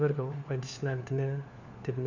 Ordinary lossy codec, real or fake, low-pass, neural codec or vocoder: none; fake; 7.2 kHz; codec, 16 kHz, 4 kbps, FunCodec, trained on LibriTTS, 50 frames a second